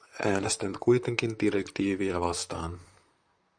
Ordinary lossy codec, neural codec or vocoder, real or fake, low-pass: Opus, 64 kbps; vocoder, 44.1 kHz, 128 mel bands, Pupu-Vocoder; fake; 9.9 kHz